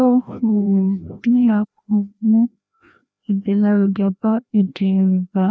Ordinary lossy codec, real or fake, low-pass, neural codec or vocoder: none; fake; none; codec, 16 kHz, 1 kbps, FreqCodec, larger model